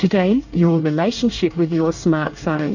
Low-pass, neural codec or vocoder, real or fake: 7.2 kHz; codec, 24 kHz, 1 kbps, SNAC; fake